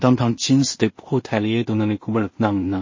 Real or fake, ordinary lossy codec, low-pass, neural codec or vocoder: fake; MP3, 32 kbps; 7.2 kHz; codec, 16 kHz in and 24 kHz out, 0.4 kbps, LongCat-Audio-Codec, two codebook decoder